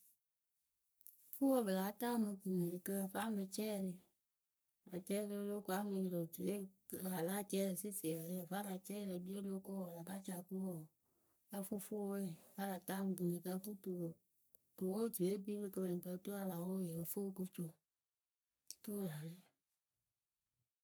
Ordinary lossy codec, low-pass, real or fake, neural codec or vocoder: none; none; fake; codec, 44.1 kHz, 3.4 kbps, Pupu-Codec